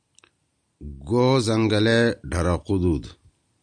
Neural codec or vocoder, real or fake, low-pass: none; real; 9.9 kHz